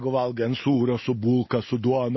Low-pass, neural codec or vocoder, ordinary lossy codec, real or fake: 7.2 kHz; none; MP3, 24 kbps; real